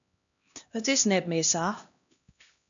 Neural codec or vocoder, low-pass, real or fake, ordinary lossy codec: codec, 16 kHz, 1 kbps, X-Codec, HuBERT features, trained on LibriSpeech; 7.2 kHz; fake; MP3, 64 kbps